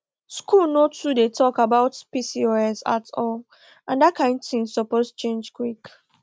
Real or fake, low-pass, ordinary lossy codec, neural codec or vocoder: real; none; none; none